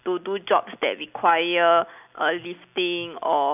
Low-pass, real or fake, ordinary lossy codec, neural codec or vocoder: 3.6 kHz; real; none; none